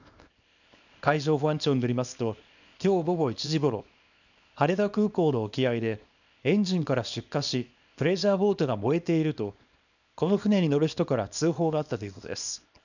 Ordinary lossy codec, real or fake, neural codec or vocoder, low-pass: none; fake; codec, 24 kHz, 0.9 kbps, WavTokenizer, small release; 7.2 kHz